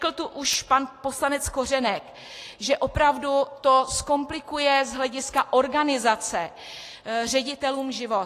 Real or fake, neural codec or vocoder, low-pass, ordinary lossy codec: real; none; 14.4 kHz; AAC, 48 kbps